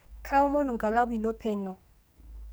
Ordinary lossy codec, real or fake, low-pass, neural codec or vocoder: none; fake; none; codec, 44.1 kHz, 2.6 kbps, SNAC